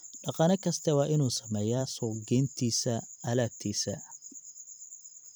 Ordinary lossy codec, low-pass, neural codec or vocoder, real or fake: none; none; vocoder, 44.1 kHz, 128 mel bands every 256 samples, BigVGAN v2; fake